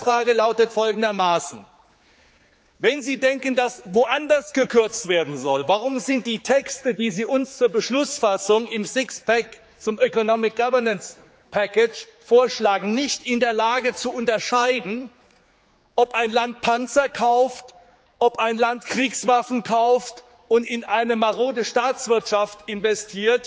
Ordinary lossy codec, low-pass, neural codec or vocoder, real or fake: none; none; codec, 16 kHz, 4 kbps, X-Codec, HuBERT features, trained on general audio; fake